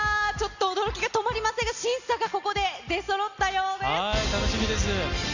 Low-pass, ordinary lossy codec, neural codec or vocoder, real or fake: 7.2 kHz; none; none; real